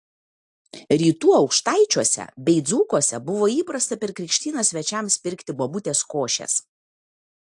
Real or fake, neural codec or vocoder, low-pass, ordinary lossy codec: real; none; 10.8 kHz; AAC, 64 kbps